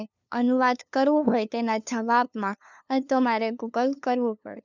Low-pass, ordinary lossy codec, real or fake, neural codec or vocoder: 7.2 kHz; none; fake; codec, 16 kHz, 2 kbps, FunCodec, trained on LibriTTS, 25 frames a second